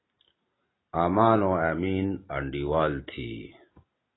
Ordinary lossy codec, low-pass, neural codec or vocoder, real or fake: AAC, 16 kbps; 7.2 kHz; none; real